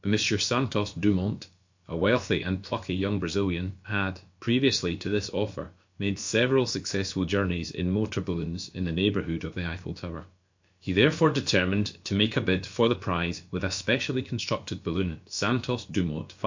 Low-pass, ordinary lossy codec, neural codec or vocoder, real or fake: 7.2 kHz; MP3, 48 kbps; codec, 16 kHz, about 1 kbps, DyCAST, with the encoder's durations; fake